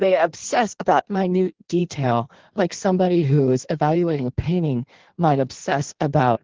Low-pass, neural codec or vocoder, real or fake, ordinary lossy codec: 7.2 kHz; codec, 16 kHz in and 24 kHz out, 1.1 kbps, FireRedTTS-2 codec; fake; Opus, 16 kbps